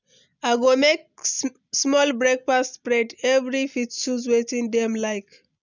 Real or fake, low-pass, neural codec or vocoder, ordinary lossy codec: real; 7.2 kHz; none; none